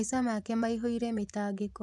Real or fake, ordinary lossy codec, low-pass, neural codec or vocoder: fake; none; none; vocoder, 24 kHz, 100 mel bands, Vocos